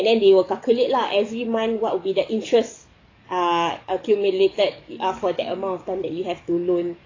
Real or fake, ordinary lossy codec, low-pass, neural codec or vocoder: fake; AAC, 32 kbps; 7.2 kHz; codec, 44.1 kHz, 7.8 kbps, DAC